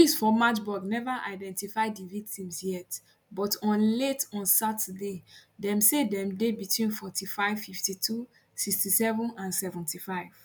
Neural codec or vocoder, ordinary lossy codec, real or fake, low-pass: none; none; real; none